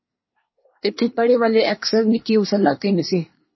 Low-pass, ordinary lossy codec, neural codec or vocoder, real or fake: 7.2 kHz; MP3, 24 kbps; codec, 24 kHz, 1 kbps, SNAC; fake